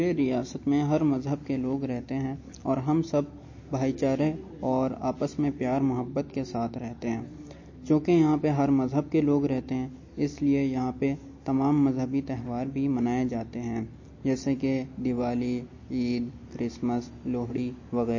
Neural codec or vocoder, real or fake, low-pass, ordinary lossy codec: none; real; 7.2 kHz; MP3, 32 kbps